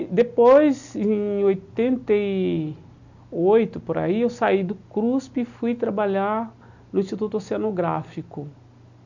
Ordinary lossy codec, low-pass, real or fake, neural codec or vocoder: none; 7.2 kHz; real; none